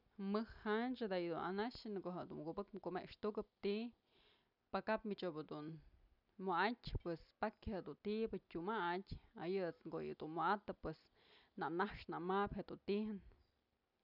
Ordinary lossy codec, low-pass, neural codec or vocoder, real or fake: none; 5.4 kHz; none; real